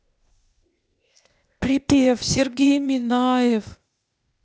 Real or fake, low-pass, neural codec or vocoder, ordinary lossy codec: fake; none; codec, 16 kHz, 0.8 kbps, ZipCodec; none